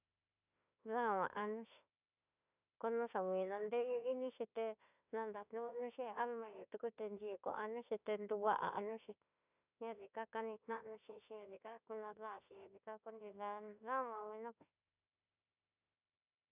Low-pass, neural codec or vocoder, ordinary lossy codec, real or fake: 3.6 kHz; autoencoder, 48 kHz, 32 numbers a frame, DAC-VAE, trained on Japanese speech; none; fake